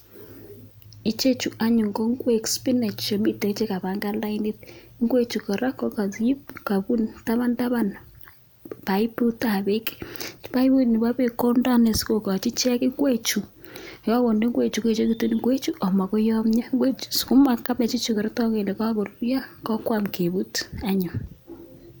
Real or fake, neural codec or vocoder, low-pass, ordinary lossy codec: real; none; none; none